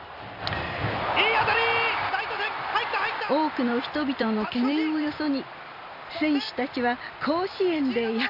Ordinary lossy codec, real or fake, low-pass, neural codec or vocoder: none; fake; 5.4 kHz; vocoder, 44.1 kHz, 128 mel bands every 256 samples, BigVGAN v2